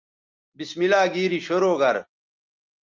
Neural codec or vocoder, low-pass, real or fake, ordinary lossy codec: none; 7.2 kHz; real; Opus, 24 kbps